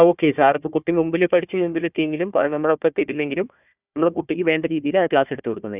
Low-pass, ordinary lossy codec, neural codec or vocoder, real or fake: 3.6 kHz; none; codec, 16 kHz, 1 kbps, FunCodec, trained on Chinese and English, 50 frames a second; fake